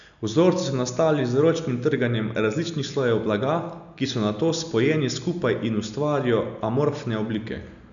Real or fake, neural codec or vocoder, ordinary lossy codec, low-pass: real; none; none; 7.2 kHz